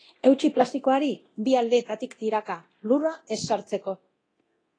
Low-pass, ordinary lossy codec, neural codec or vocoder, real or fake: 9.9 kHz; AAC, 32 kbps; codec, 24 kHz, 0.9 kbps, DualCodec; fake